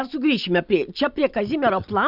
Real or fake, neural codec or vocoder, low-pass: real; none; 5.4 kHz